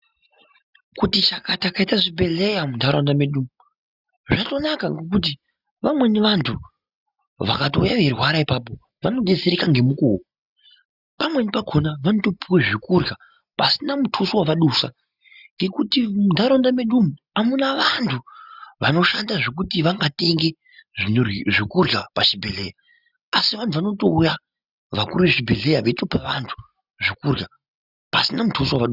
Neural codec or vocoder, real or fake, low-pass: none; real; 5.4 kHz